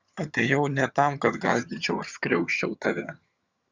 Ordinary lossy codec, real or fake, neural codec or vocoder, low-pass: Opus, 64 kbps; fake; vocoder, 22.05 kHz, 80 mel bands, HiFi-GAN; 7.2 kHz